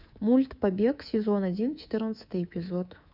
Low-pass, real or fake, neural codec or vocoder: 5.4 kHz; fake; codec, 24 kHz, 3.1 kbps, DualCodec